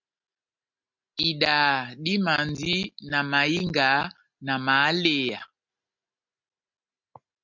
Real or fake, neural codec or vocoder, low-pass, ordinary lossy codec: real; none; 7.2 kHz; MP3, 64 kbps